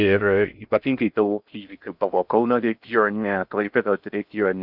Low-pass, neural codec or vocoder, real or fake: 5.4 kHz; codec, 16 kHz in and 24 kHz out, 0.6 kbps, FocalCodec, streaming, 4096 codes; fake